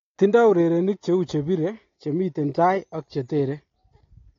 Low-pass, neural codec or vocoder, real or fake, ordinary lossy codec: 7.2 kHz; none; real; AAC, 32 kbps